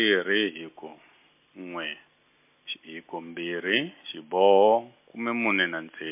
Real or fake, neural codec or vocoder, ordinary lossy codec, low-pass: real; none; MP3, 24 kbps; 3.6 kHz